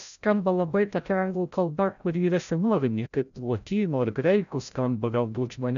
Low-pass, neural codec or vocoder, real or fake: 7.2 kHz; codec, 16 kHz, 0.5 kbps, FreqCodec, larger model; fake